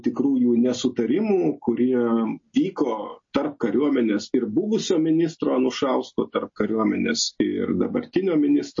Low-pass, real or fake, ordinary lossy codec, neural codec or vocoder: 7.2 kHz; real; MP3, 32 kbps; none